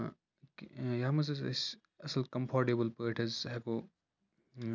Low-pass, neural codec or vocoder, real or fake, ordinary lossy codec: 7.2 kHz; none; real; none